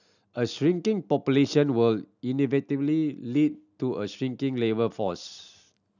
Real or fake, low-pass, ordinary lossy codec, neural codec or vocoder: real; 7.2 kHz; none; none